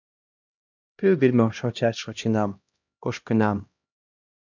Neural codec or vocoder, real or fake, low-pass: codec, 16 kHz, 1 kbps, X-Codec, HuBERT features, trained on LibriSpeech; fake; 7.2 kHz